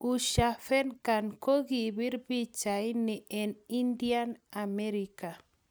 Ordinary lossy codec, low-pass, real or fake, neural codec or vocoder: none; none; real; none